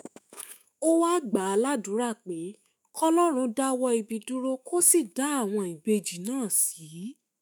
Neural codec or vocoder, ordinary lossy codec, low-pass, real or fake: autoencoder, 48 kHz, 128 numbers a frame, DAC-VAE, trained on Japanese speech; none; none; fake